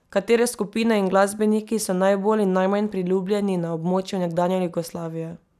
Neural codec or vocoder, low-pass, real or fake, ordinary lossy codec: none; 14.4 kHz; real; none